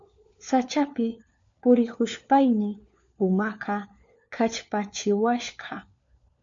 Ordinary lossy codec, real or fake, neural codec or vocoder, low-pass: MP3, 64 kbps; fake; codec, 16 kHz, 4 kbps, FunCodec, trained on LibriTTS, 50 frames a second; 7.2 kHz